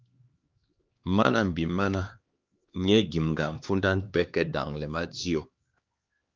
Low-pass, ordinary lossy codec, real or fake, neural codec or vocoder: 7.2 kHz; Opus, 24 kbps; fake; codec, 16 kHz, 2 kbps, X-Codec, HuBERT features, trained on LibriSpeech